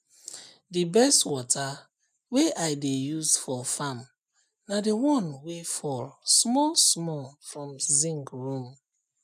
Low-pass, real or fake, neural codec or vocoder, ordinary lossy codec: 14.4 kHz; real; none; none